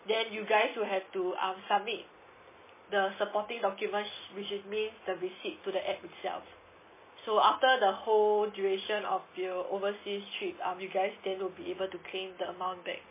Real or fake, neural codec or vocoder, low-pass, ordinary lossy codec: real; none; 3.6 kHz; MP3, 16 kbps